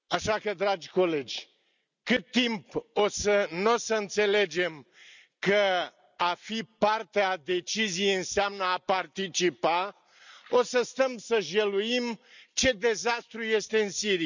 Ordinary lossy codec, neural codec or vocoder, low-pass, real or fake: none; none; 7.2 kHz; real